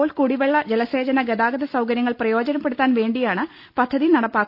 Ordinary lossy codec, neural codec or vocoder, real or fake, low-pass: none; none; real; 5.4 kHz